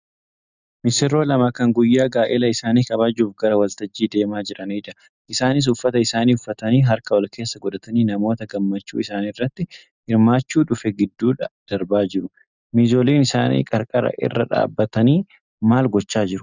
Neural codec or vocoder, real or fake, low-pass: none; real; 7.2 kHz